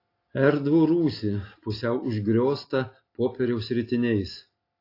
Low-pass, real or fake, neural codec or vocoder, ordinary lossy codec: 5.4 kHz; real; none; AAC, 32 kbps